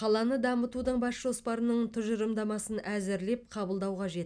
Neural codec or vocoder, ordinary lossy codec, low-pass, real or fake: none; none; 9.9 kHz; real